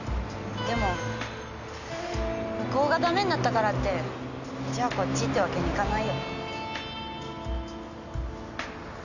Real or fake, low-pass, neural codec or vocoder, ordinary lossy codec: real; 7.2 kHz; none; none